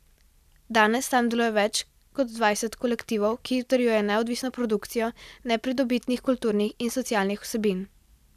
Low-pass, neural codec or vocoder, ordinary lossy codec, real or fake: 14.4 kHz; none; none; real